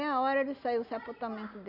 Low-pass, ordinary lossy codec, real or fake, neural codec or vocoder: 5.4 kHz; none; real; none